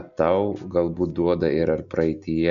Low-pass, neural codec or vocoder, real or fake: 7.2 kHz; none; real